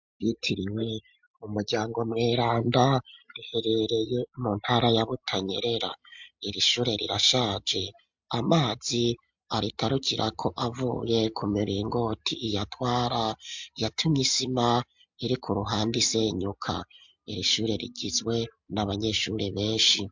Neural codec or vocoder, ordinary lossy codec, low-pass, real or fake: none; MP3, 64 kbps; 7.2 kHz; real